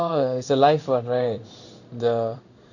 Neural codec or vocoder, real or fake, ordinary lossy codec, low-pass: codec, 16 kHz in and 24 kHz out, 1 kbps, XY-Tokenizer; fake; none; 7.2 kHz